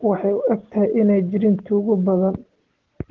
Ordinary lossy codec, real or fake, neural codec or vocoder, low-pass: Opus, 16 kbps; real; none; 7.2 kHz